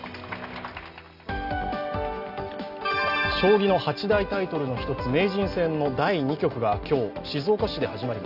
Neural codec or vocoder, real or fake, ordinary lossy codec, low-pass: none; real; none; 5.4 kHz